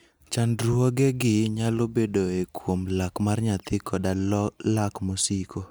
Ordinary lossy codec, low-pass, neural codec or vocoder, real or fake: none; none; none; real